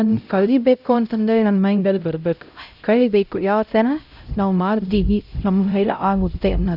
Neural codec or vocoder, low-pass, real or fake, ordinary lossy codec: codec, 16 kHz, 0.5 kbps, X-Codec, HuBERT features, trained on LibriSpeech; 5.4 kHz; fake; none